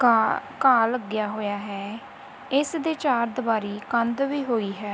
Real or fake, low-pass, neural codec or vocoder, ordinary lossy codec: real; none; none; none